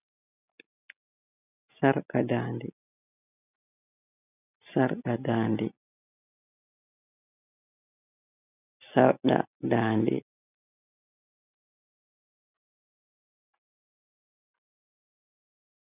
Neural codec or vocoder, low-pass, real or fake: none; 3.6 kHz; real